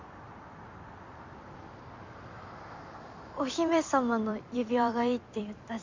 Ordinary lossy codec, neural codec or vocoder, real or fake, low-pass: MP3, 64 kbps; vocoder, 44.1 kHz, 128 mel bands every 256 samples, BigVGAN v2; fake; 7.2 kHz